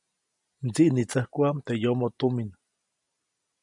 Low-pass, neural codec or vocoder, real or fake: 10.8 kHz; none; real